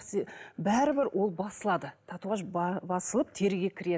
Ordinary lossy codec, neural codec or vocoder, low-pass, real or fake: none; none; none; real